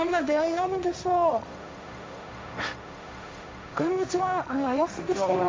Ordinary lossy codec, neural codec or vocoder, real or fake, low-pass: none; codec, 16 kHz, 1.1 kbps, Voila-Tokenizer; fake; none